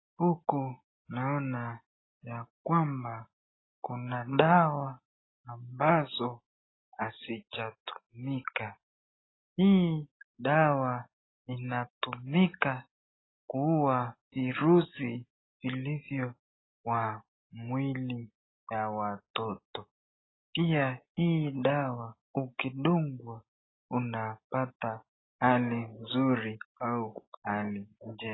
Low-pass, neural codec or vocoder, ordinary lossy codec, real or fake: 7.2 kHz; none; AAC, 16 kbps; real